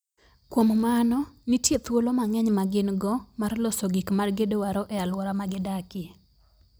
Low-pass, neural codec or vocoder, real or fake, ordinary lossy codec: none; none; real; none